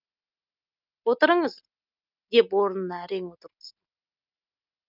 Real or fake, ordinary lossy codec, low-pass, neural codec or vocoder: real; none; 5.4 kHz; none